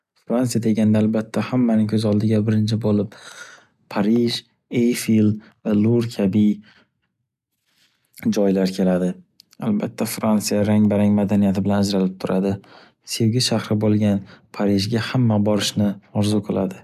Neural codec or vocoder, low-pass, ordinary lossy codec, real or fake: none; 14.4 kHz; none; real